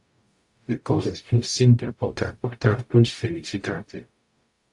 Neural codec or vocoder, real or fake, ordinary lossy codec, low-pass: codec, 44.1 kHz, 0.9 kbps, DAC; fake; AAC, 64 kbps; 10.8 kHz